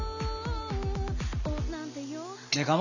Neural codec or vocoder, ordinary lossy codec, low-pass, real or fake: none; none; 7.2 kHz; real